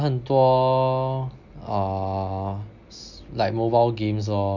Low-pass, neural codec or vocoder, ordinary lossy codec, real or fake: 7.2 kHz; none; none; real